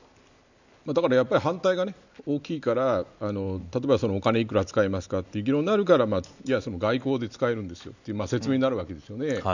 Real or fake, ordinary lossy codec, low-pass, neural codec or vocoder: real; none; 7.2 kHz; none